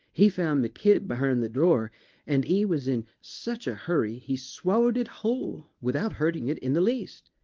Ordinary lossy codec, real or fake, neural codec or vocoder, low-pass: Opus, 24 kbps; fake; codec, 24 kHz, 0.9 kbps, WavTokenizer, medium speech release version 1; 7.2 kHz